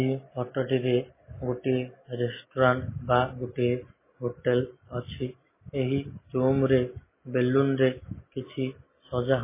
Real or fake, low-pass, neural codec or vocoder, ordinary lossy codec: real; 3.6 kHz; none; MP3, 16 kbps